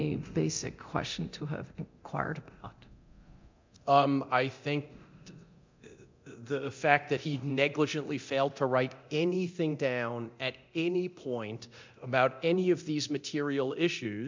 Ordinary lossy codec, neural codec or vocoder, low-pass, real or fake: MP3, 64 kbps; codec, 24 kHz, 0.9 kbps, DualCodec; 7.2 kHz; fake